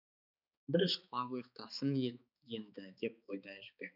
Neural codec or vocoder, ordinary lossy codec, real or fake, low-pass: codec, 16 kHz, 4 kbps, X-Codec, HuBERT features, trained on balanced general audio; none; fake; 5.4 kHz